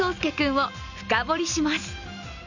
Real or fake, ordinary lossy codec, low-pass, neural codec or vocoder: real; none; 7.2 kHz; none